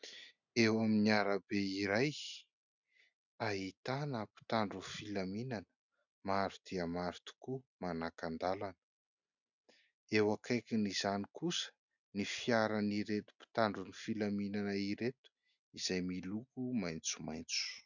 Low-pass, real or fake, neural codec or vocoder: 7.2 kHz; real; none